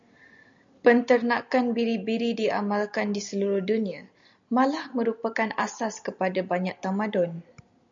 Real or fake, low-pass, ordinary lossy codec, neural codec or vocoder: real; 7.2 kHz; AAC, 64 kbps; none